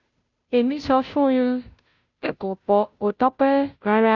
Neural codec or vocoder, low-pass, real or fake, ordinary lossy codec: codec, 16 kHz, 0.5 kbps, FunCodec, trained on Chinese and English, 25 frames a second; 7.2 kHz; fake; none